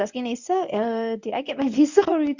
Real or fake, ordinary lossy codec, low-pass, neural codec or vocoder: fake; none; 7.2 kHz; codec, 24 kHz, 0.9 kbps, WavTokenizer, medium speech release version 1